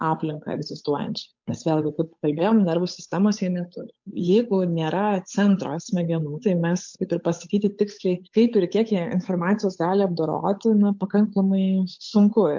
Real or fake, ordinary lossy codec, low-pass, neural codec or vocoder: fake; MP3, 48 kbps; 7.2 kHz; codec, 16 kHz, 8 kbps, FunCodec, trained on Chinese and English, 25 frames a second